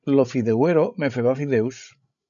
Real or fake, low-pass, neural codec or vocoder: fake; 7.2 kHz; codec, 16 kHz, 16 kbps, FreqCodec, larger model